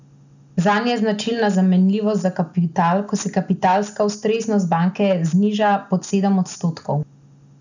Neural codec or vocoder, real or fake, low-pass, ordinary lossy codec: none; real; 7.2 kHz; none